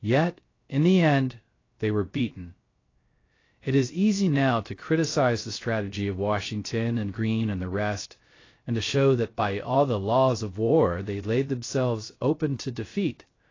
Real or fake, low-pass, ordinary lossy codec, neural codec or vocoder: fake; 7.2 kHz; AAC, 32 kbps; codec, 16 kHz, 0.3 kbps, FocalCodec